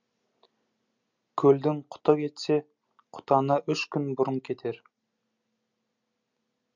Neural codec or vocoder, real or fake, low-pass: none; real; 7.2 kHz